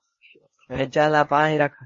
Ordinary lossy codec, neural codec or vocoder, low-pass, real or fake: MP3, 32 kbps; codec, 16 kHz, 0.8 kbps, ZipCodec; 7.2 kHz; fake